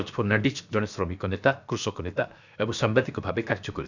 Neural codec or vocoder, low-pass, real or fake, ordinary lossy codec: codec, 16 kHz, 0.7 kbps, FocalCodec; 7.2 kHz; fake; none